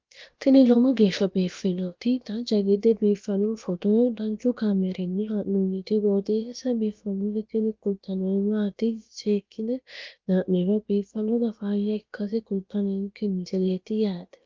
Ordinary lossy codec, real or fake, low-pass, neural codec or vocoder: Opus, 24 kbps; fake; 7.2 kHz; codec, 16 kHz, about 1 kbps, DyCAST, with the encoder's durations